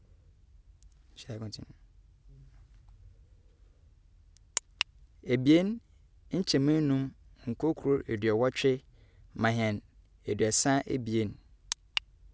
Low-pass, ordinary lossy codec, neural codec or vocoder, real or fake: none; none; none; real